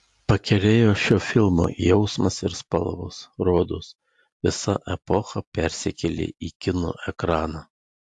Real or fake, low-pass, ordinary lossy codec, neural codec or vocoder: real; 10.8 kHz; AAC, 64 kbps; none